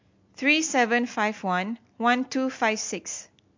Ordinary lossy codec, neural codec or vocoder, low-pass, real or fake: MP3, 48 kbps; none; 7.2 kHz; real